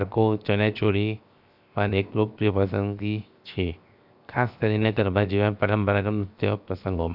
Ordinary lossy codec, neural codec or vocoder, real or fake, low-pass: AAC, 48 kbps; codec, 16 kHz, 0.7 kbps, FocalCodec; fake; 5.4 kHz